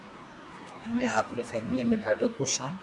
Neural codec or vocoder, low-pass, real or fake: codec, 24 kHz, 1 kbps, SNAC; 10.8 kHz; fake